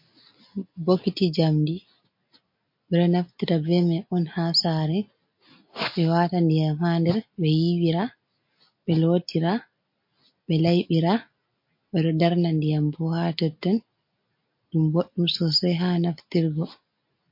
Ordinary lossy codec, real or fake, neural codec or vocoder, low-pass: MP3, 32 kbps; real; none; 5.4 kHz